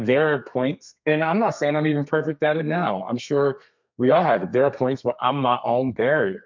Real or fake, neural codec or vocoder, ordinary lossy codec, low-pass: fake; codec, 32 kHz, 1.9 kbps, SNAC; MP3, 64 kbps; 7.2 kHz